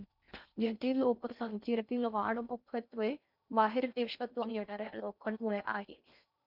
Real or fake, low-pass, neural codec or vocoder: fake; 5.4 kHz; codec, 16 kHz in and 24 kHz out, 0.6 kbps, FocalCodec, streaming, 2048 codes